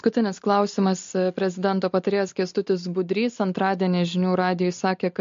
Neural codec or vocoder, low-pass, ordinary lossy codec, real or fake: none; 7.2 kHz; MP3, 48 kbps; real